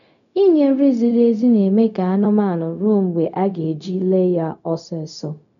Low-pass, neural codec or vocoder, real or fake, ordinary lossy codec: 7.2 kHz; codec, 16 kHz, 0.4 kbps, LongCat-Audio-Codec; fake; none